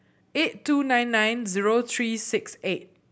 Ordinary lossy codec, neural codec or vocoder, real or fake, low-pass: none; none; real; none